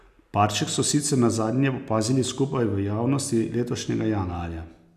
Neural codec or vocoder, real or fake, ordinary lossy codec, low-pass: none; real; none; 14.4 kHz